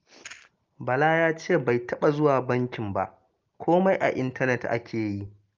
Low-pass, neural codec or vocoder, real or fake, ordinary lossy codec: 7.2 kHz; none; real; Opus, 32 kbps